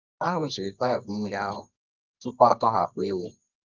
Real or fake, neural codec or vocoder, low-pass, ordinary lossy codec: fake; codec, 24 kHz, 0.9 kbps, WavTokenizer, medium music audio release; 7.2 kHz; Opus, 24 kbps